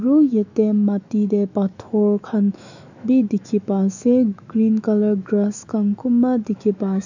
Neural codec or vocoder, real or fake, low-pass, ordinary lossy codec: autoencoder, 48 kHz, 128 numbers a frame, DAC-VAE, trained on Japanese speech; fake; 7.2 kHz; none